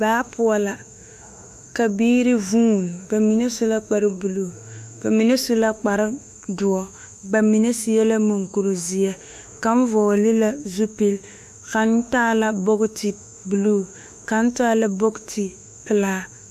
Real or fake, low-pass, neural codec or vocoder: fake; 14.4 kHz; autoencoder, 48 kHz, 32 numbers a frame, DAC-VAE, trained on Japanese speech